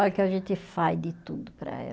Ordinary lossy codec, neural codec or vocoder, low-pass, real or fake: none; none; none; real